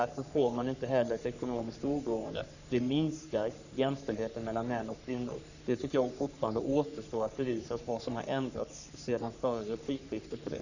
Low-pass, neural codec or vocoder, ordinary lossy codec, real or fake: 7.2 kHz; codec, 44.1 kHz, 3.4 kbps, Pupu-Codec; none; fake